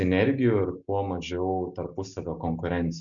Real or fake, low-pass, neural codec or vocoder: real; 7.2 kHz; none